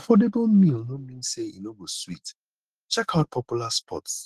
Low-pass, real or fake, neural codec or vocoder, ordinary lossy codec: 14.4 kHz; fake; autoencoder, 48 kHz, 128 numbers a frame, DAC-VAE, trained on Japanese speech; Opus, 16 kbps